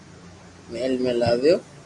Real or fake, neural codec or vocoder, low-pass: real; none; 10.8 kHz